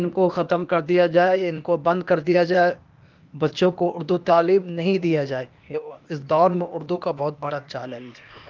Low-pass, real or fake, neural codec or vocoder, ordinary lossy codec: 7.2 kHz; fake; codec, 16 kHz, 0.8 kbps, ZipCodec; Opus, 24 kbps